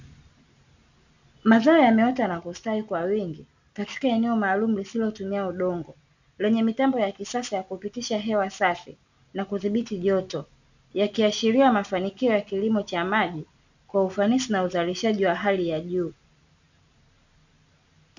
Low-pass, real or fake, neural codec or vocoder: 7.2 kHz; real; none